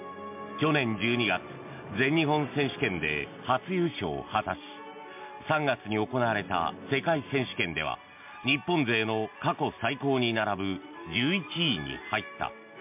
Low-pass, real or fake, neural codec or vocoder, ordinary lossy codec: 3.6 kHz; real; none; MP3, 32 kbps